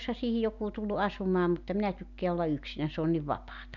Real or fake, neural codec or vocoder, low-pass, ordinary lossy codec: real; none; 7.2 kHz; none